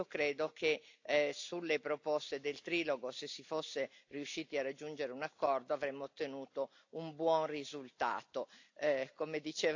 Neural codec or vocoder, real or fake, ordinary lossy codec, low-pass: none; real; AAC, 48 kbps; 7.2 kHz